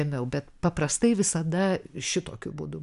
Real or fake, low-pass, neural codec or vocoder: real; 10.8 kHz; none